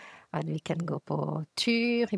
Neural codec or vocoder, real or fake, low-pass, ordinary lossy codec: vocoder, 22.05 kHz, 80 mel bands, HiFi-GAN; fake; none; none